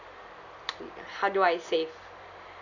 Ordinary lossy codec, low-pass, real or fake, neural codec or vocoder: none; 7.2 kHz; real; none